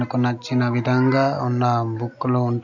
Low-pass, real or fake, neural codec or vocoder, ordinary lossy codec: 7.2 kHz; real; none; none